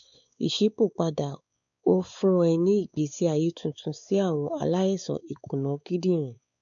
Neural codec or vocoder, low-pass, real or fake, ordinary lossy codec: codec, 16 kHz, 4 kbps, X-Codec, WavLM features, trained on Multilingual LibriSpeech; 7.2 kHz; fake; none